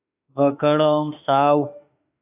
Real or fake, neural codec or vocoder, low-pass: fake; autoencoder, 48 kHz, 32 numbers a frame, DAC-VAE, trained on Japanese speech; 3.6 kHz